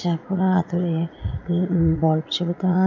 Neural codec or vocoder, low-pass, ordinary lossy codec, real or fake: vocoder, 44.1 kHz, 80 mel bands, Vocos; 7.2 kHz; none; fake